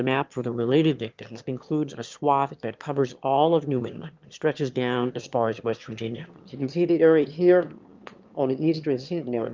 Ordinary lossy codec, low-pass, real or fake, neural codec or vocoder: Opus, 32 kbps; 7.2 kHz; fake; autoencoder, 22.05 kHz, a latent of 192 numbers a frame, VITS, trained on one speaker